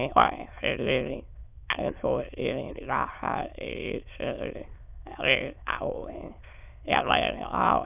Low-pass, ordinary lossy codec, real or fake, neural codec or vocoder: 3.6 kHz; none; fake; autoencoder, 22.05 kHz, a latent of 192 numbers a frame, VITS, trained on many speakers